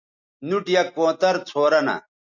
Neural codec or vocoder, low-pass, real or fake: none; 7.2 kHz; real